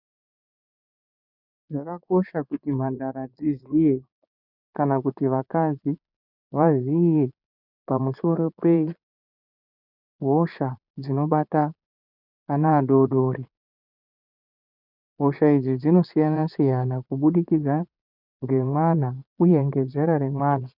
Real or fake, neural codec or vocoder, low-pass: fake; vocoder, 22.05 kHz, 80 mel bands, Vocos; 5.4 kHz